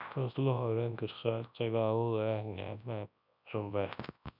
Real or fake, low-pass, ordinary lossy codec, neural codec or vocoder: fake; 5.4 kHz; none; codec, 24 kHz, 0.9 kbps, WavTokenizer, large speech release